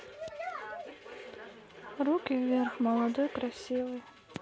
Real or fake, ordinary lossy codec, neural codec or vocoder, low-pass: real; none; none; none